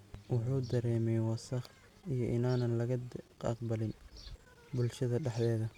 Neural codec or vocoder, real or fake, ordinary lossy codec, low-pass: none; real; none; 19.8 kHz